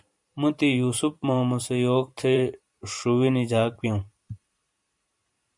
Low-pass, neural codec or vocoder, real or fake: 10.8 kHz; vocoder, 44.1 kHz, 128 mel bands every 512 samples, BigVGAN v2; fake